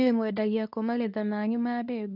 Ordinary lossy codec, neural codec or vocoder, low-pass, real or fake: none; codec, 24 kHz, 0.9 kbps, WavTokenizer, medium speech release version 2; 5.4 kHz; fake